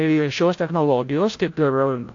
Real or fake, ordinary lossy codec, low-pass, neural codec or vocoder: fake; AAC, 48 kbps; 7.2 kHz; codec, 16 kHz, 0.5 kbps, FreqCodec, larger model